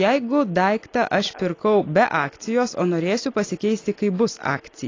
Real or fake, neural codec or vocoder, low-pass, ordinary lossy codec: real; none; 7.2 kHz; AAC, 32 kbps